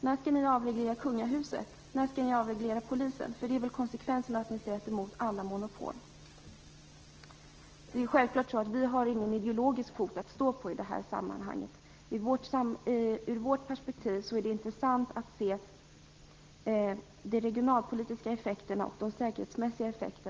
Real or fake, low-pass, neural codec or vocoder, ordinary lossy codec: real; 7.2 kHz; none; Opus, 16 kbps